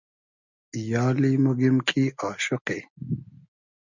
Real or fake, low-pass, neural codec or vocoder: real; 7.2 kHz; none